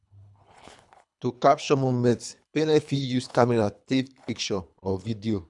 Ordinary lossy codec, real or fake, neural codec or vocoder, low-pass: none; fake; codec, 24 kHz, 3 kbps, HILCodec; 10.8 kHz